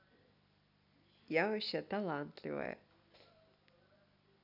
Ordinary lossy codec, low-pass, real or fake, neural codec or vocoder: none; 5.4 kHz; real; none